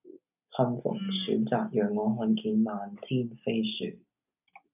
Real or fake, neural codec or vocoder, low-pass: real; none; 3.6 kHz